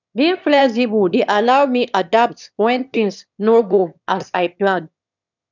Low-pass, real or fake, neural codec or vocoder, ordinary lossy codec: 7.2 kHz; fake; autoencoder, 22.05 kHz, a latent of 192 numbers a frame, VITS, trained on one speaker; none